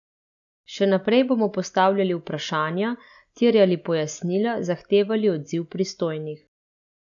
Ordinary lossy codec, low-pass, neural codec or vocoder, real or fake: none; 7.2 kHz; none; real